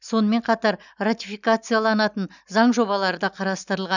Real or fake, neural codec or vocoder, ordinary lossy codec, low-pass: real; none; none; 7.2 kHz